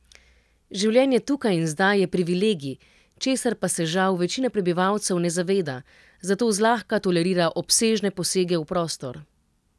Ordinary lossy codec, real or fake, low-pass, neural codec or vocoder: none; real; none; none